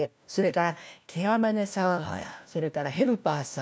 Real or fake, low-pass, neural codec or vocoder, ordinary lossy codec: fake; none; codec, 16 kHz, 1 kbps, FunCodec, trained on LibriTTS, 50 frames a second; none